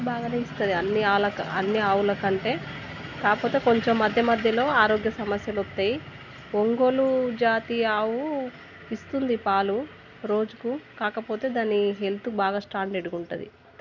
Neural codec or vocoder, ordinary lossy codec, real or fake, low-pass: none; none; real; 7.2 kHz